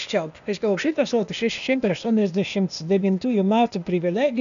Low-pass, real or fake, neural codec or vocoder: 7.2 kHz; fake; codec, 16 kHz, 0.8 kbps, ZipCodec